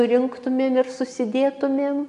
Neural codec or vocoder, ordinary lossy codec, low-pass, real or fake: none; MP3, 96 kbps; 10.8 kHz; real